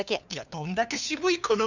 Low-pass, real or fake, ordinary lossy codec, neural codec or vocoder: 7.2 kHz; fake; none; codec, 16 kHz, 2 kbps, FunCodec, trained on LibriTTS, 25 frames a second